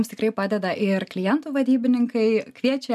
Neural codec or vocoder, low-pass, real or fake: none; 14.4 kHz; real